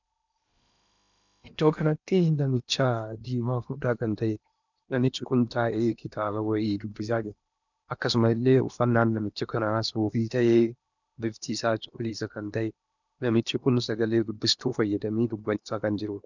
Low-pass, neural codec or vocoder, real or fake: 7.2 kHz; codec, 16 kHz in and 24 kHz out, 0.8 kbps, FocalCodec, streaming, 65536 codes; fake